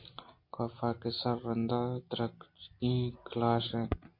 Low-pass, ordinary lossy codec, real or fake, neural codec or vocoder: 5.4 kHz; MP3, 32 kbps; real; none